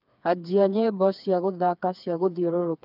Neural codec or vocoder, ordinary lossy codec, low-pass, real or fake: codec, 16 kHz, 4 kbps, FreqCodec, smaller model; none; 5.4 kHz; fake